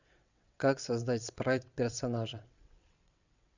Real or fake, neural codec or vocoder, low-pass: fake; vocoder, 22.05 kHz, 80 mel bands, WaveNeXt; 7.2 kHz